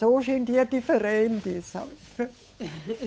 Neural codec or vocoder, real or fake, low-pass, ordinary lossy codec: codec, 16 kHz, 8 kbps, FunCodec, trained on Chinese and English, 25 frames a second; fake; none; none